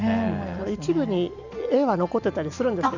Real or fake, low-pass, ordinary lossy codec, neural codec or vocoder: real; 7.2 kHz; none; none